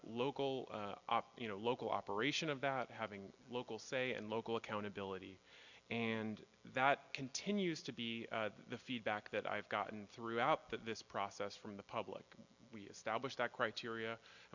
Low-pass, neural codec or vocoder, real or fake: 7.2 kHz; none; real